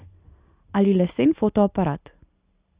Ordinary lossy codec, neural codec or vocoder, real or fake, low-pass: Opus, 64 kbps; none; real; 3.6 kHz